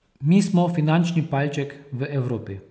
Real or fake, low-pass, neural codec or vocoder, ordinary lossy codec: real; none; none; none